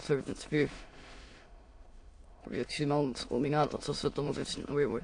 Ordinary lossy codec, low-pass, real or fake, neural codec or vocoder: AAC, 48 kbps; 9.9 kHz; fake; autoencoder, 22.05 kHz, a latent of 192 numbers a frame, VITS, trained on many speakers